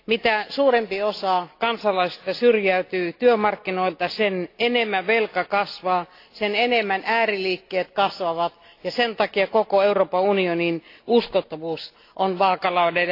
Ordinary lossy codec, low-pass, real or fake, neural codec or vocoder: AAC, 32 kbps; 5.4 kHz; real; none